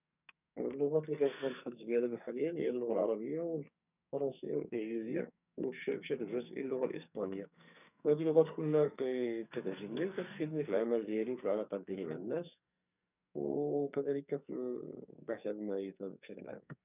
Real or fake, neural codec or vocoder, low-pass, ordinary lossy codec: fake; codec, 44.1 kHz, 2.6 kbps, SNAC; 3.6 kHz; none